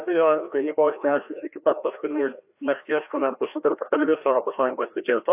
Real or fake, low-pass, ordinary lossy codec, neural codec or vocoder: fake; 3.6 kHz; AAC, 32 kbps; codec, 16 kHz, 1 kbps, FreqCodec, larger model